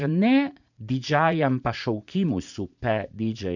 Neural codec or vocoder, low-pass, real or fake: vocoder, 44.1 kHz, 80 mel bands, Vocos; 7.2 kHz; fake